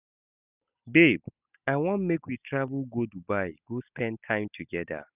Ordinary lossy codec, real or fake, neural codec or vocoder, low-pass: none; real; none; 3.6 kHz